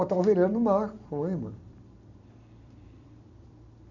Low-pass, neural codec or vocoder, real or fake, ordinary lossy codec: 7.2 kHz; vocoder, 44.1 kHz, 128 mel bands every 256 samples, BigVGAN v2; fake; none